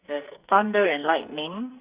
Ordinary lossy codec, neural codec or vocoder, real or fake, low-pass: Opus, 64 kbps; codec, 44.1 kHz, 2.6 kbps, SNAC; fake; 3.6 kHz